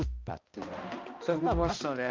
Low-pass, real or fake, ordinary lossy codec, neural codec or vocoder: 7.2 kHz; fake; Opus, 24 kbps; codec, 16 kHz, 1 kbps, X-Codec, HuBERT features, trained on balanced general audio